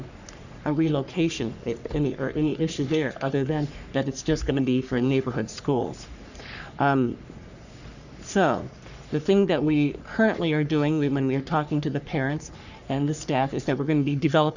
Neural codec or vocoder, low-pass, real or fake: codec, 44.1 kHz, 3.4 kbps, Pupu-Codec; 7.2 kHz; fake